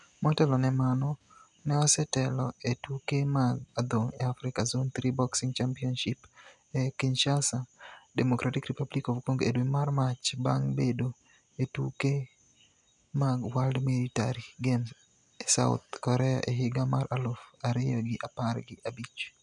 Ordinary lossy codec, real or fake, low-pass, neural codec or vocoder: none; real; 10.8 kHz; none